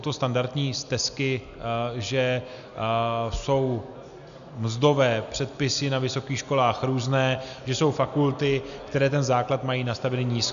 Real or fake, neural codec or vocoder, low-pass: real; none; 7.2 kHz